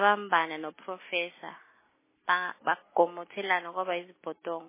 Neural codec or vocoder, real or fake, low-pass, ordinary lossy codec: none; real; 3.6 kHz; MP3, 16 kbps